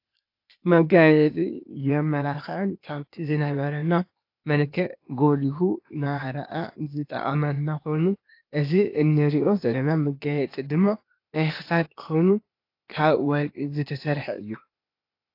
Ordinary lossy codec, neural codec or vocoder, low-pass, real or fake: AAC, 32 kbps; codec, 16 kHz, 0.8 kbps, ZipCodec; 5.4 kHz; fake